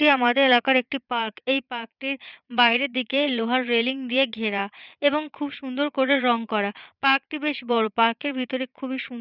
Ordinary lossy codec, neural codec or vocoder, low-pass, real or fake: none; vocoder, 22.05 kHz, 80 mel bands, Vocos; 5.4 kHz; fake